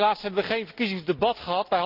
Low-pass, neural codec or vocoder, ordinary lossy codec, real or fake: 5.4 kHz; none; Opus, 32 kbps; real